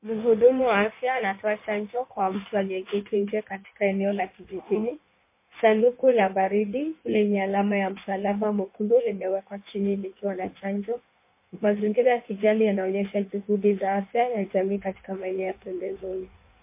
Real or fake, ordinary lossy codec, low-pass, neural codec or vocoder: fake; MP3, 24 kbps; 3.6 kHz; codec, 16 kHz in and 24 kHz out, 1.1 kbps, FireRedTTS-2 codec